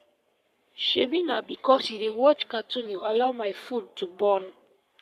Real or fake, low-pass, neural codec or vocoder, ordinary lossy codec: fake; 14.4 kHz; codec, 44.1 kHz, 3.4 kbps, Pupu-Codec; MP3, 96 kbps